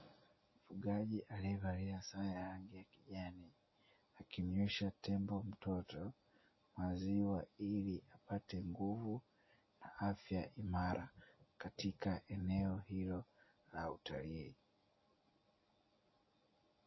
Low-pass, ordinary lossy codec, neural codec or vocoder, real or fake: 7.2 kHz; MP3, 24 kbps; none; real